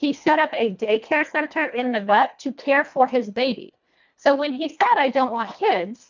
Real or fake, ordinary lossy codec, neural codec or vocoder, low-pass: fake; MP3, 64 kbps; codec, 24 kHz, 1.5 kbps, HILCodec; 7.2 kHz